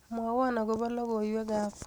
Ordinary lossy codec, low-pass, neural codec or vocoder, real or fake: none; none; none; real